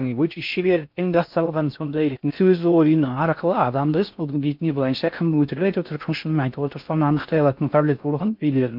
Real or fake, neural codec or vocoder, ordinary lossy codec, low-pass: fake; codec, 16 kHz in and 24 kHz out, 0.6 kbps, FocalCodec, streaming, 4096 codes; MP3, 48 kbps; 5.4 kHz